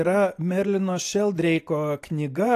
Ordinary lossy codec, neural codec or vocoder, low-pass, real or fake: AAC, 64 kbps; none; 14.4 kHz; real